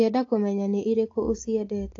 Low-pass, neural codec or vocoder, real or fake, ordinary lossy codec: 7.2 kHz; none; real; AAC, 32 kbps